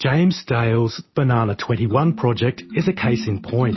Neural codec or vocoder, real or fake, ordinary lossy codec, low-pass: none; real; MP3, 24 kbps; 7.2 kHz